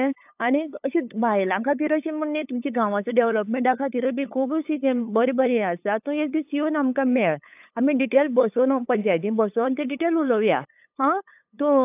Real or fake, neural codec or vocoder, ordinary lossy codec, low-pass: fake; codec, 16 kHz, 8 kbps, FunCodec, trained on LibriTTS, 25 frames a second; AAC, 32 kbps; 3.6 kHz